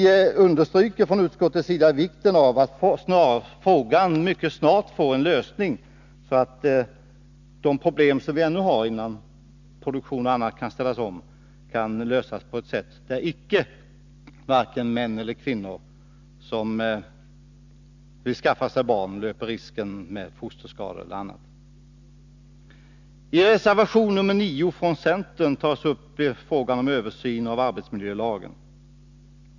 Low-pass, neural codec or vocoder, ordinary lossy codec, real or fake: 7.2 kHz; none; none; real